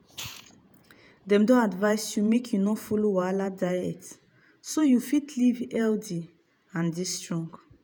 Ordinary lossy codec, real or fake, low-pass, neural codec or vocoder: none; fake; none; vocoder, 48 kHz, 128 mel bands, Vocos